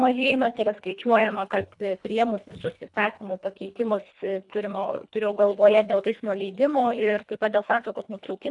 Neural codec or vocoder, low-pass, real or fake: codec, 24 kHz, 1.5 kbps, HILCodec; 10.8 kHz; fake